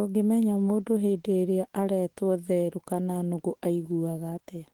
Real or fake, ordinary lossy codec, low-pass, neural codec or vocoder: fake; Opus, 16 kbps; 19.8 kHz; autoencoder, 48 kHz, 128 numbers a frame, DAC-VAE, trained on Japanese speech